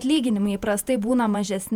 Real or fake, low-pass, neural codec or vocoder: fake; 19.8 kHz; vocoder, 48 kHz, 128 mel bands, Vocos